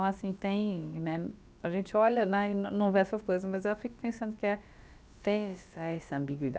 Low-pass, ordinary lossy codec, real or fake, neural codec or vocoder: none; none; fake; codec, 16 kHz, about 1 kbps, DyCAST, with the encoder's durations